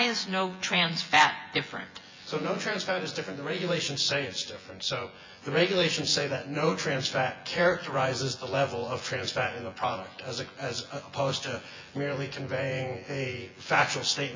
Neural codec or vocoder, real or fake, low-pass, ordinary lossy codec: vocoder, 24 kHz, 100 mel bands, Vocos; fake; 7.2 kHz; MP3, 48 kbps